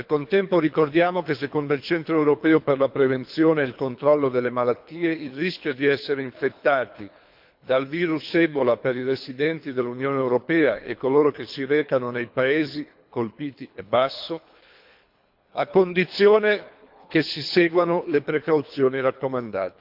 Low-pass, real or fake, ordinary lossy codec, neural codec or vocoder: 5.4 kHz; fake; MP3, 48 kbps; codec, 24 kHz, 3 kbps, HILCodec